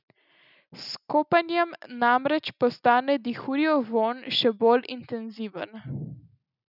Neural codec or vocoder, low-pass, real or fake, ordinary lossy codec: none; 5.4 kHz; real; none